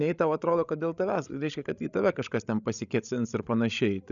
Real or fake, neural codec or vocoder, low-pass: fake; codec, 16 kHz, 16 kbps, FreqCodec, larger model; 7.2 kHz